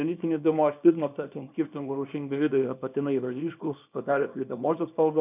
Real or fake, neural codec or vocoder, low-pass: fake; codec, 24 kHz, 0.9 kbps, WavTokenizer, small release; 3.6 kHz